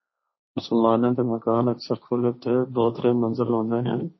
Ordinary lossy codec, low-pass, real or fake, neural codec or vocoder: MP3, 24 kbps; 7.2 kHz; fake; codec, 16 kHz, 1.1 kbps, Voila-Tokenizer